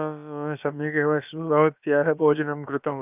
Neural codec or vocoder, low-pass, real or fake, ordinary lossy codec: codec, 16 kHz, about 1 kbps, DyCAST, with the encoder's durations; 3.6 kHz; fake; none